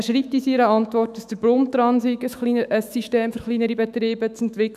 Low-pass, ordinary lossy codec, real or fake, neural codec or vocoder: 14.4 kHz; none; fake; autoencoder, 48 kHz, 128 numbers a frame, DAC-VAE, trained on Japanese speech